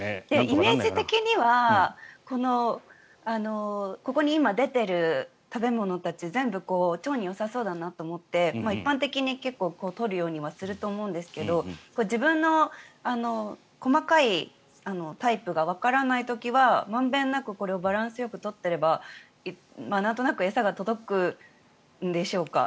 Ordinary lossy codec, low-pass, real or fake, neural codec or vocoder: none; none; real; none